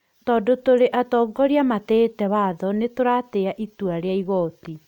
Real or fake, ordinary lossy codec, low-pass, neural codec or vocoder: real; none; 19.8 kHz; none